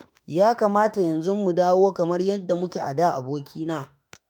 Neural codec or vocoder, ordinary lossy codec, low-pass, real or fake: autoencoder, 48 kHz, 32 numbers a frame, DAC-VAE, trained on Japanese speech; none; none; fake